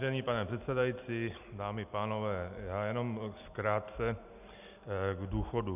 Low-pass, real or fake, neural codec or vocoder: 3.6 kHz; real; none